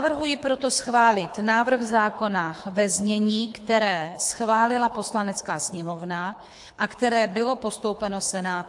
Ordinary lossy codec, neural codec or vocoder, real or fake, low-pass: AAC, 64 kbps; codec, 24 kHz, 3 kbps, HILCodec; fake; 10.8 kHz